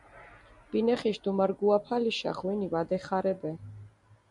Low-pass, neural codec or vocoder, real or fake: 10.8 kHz; none; real